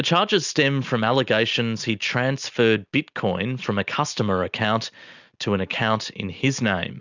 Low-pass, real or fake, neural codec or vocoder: 7.2 kHz; real; none